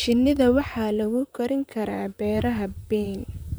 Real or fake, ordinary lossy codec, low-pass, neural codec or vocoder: fake; none; none; vocoder, 44.1 kHz, 128 mel bands, Pupu-Vocoder